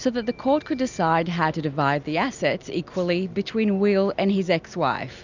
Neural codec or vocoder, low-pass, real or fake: none; 7.2 kHz; real